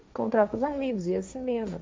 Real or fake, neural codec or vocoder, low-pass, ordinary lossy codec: fake; codec, 16 kHz, 1.1 kbps, Voila-Tokenizer; none; none